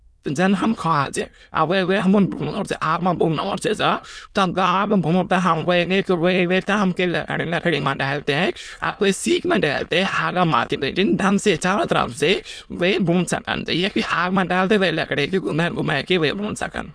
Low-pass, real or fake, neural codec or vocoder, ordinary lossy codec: none; fake; autoencoder, 22.05 kHz, a latent of 192 numbers a frame, VITS, trained on many speakers; none